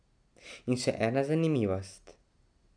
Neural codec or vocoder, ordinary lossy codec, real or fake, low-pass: none; none; real; 9.9 kHz